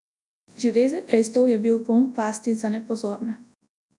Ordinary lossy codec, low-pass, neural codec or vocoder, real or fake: none; 10.8 kHz; codec, 24 kHz, 0.9 kbps, WavTokenizer, large speech release; fake